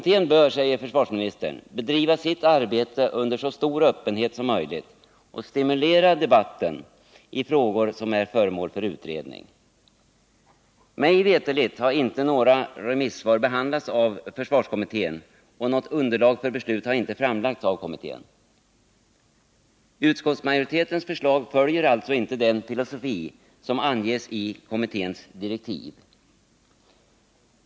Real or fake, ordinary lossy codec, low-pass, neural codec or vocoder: real; none; none; none